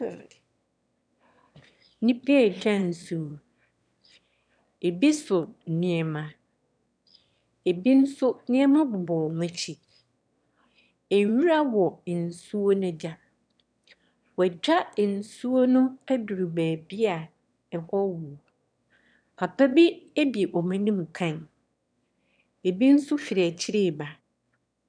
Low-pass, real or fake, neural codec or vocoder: 9.9 kHz; fake; autoencoder, 22.05 kHz, a latent of 192 numbers a frame, VITS, trained on one speaker